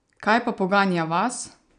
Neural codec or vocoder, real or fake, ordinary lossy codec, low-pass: none; real; none; 9.9 kHz